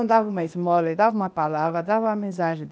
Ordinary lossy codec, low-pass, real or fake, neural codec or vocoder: none; none; fake; codec, 16 kHz, 0.8 kbps, ZipCodec